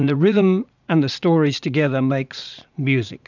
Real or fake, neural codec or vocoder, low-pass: fake; vocoder, 44.1 kHz, 80 mel bands, Vocos; 7.2 kHz